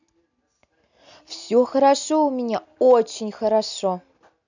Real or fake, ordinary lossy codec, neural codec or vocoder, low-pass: real; none; none; 7.2 kHz